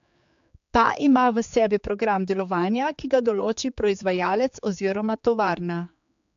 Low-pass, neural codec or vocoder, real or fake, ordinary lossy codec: 7.2 kHz; codec, 16 kHz, 4 kbps, X-Codec, HuBERT features, trained on general audio; fake; AAC, 64 kbps